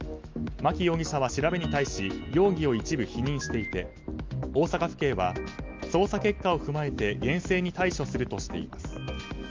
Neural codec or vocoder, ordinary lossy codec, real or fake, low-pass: none; Opus, 24 kbps; real; 7.2 kHz